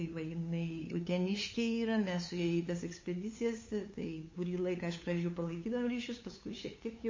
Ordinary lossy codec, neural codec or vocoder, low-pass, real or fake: MP3, 32 kbps; codec, 16 kHz, 8 kbps, FunCodec, trained on LibriTTS, 25 frames a second; 7.2 kHz; fake